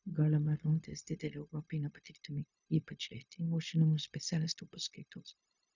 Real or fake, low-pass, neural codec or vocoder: fake; 7.2 kHz; codec, 16 kHz, 0.4 kbps, LongCat-Audio-Codec